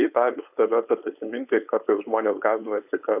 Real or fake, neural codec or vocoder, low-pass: fake; codec, 16 kHz, 4.8 kbps, FACodec; 3.6 kHz